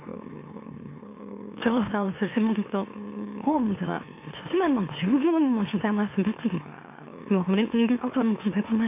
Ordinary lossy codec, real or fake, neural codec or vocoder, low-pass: MP3, 24 kbps; fake; autoencoder, 44.1 kHz, a latent of 192 numbers a frame, MeloTTS; 3.6 kHz